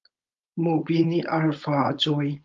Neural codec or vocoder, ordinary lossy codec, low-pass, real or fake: codec, 16 kHz, 4.8 kbps, FACodec; Opus, 32 kbps; 7.2 kHz; fake